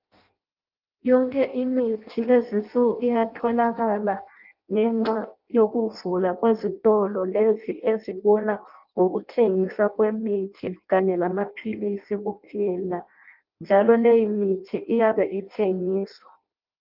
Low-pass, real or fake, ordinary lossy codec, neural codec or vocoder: 5.4 kHz; fake; Opus, 32 kbps; codec, 16 kHz in and 24 kHz out, 0.6 kbps, FireRedTTS-2 codec